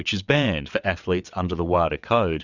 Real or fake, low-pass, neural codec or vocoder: fake; 7.2 kHz; vocoder, 22.05 kHz, 80 mel bands, WaveNeXt